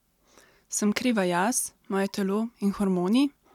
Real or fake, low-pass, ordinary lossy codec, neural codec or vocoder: real; 19.8 kHz; none; none